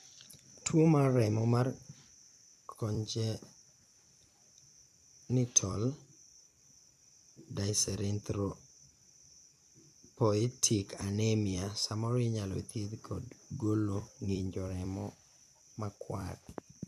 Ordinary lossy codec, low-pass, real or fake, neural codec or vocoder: none; 14.4 kHz; fake; vocoder, 44.1 kHz, 128 mel bands every 256 samples, BigVGAN v2